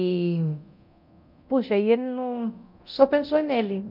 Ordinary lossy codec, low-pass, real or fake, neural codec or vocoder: MP3, 48 kbps; 5.4 kHz; fake; codec, 24 kHz, 0.9 kbps, DualCodec